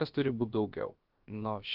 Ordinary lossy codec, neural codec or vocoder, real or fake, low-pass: Opus, 32 kbps; codec, 16 kHz, about 1 kbps, DyCAST, with the encoder's durations; fake; 5.4 kHz